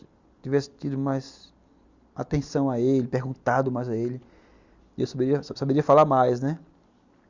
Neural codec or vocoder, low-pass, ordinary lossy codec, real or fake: none; 7.2 kHz; Opus, 64 kbps; real